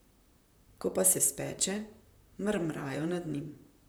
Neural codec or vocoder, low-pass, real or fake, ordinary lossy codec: vocoder, 44.1 kHz, 128 mel bands, Pupu-Vocoder; none; fake; none